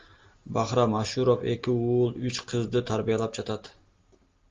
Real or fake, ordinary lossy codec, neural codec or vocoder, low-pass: real; Opus, 32 kbps; none; 7.2 kHz